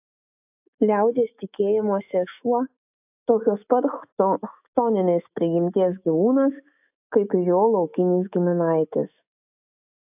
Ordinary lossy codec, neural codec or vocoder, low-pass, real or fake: AAC, 32 kbps; autoencoder, 48 kHz, 128 numbers a frame, DAC-VAE, trained on Japanese speech; 3.6 kHz; fake